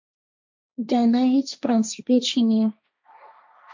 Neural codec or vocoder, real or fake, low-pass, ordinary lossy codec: codec, 16 kHz, 1.1 kbps, Voila-Tokenizer; fake; 7.2 kHz; MP3, 48 kbps